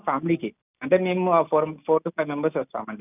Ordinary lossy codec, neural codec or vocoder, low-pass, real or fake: none; none; 3.6 kHz; real